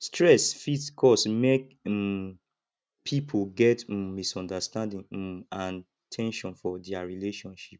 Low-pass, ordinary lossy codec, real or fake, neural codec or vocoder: none; none; real; none